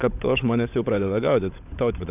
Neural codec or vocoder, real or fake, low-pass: codec, 16 kHz, 16 kbps, FunCodec, trained on LibriTTS, 50 frames a second; fake; 3.6 kHz